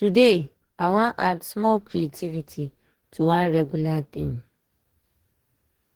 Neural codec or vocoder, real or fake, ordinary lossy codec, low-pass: codec, 44.1 kHz, 2.6 kbps, DAC; fake; Opus, 16 kbps; 19.8 kHz